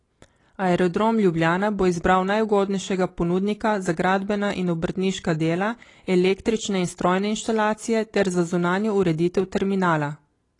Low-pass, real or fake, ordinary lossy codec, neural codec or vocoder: 10.8 kHz; real; AAC, 32 kbps; none